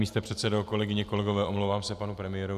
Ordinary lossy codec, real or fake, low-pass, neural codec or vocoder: AAC, 96 kbps; real; 14.4 kHz; none